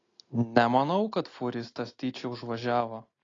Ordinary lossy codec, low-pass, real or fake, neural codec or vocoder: AAC, 32 kbps; 7.2 kHz; real; none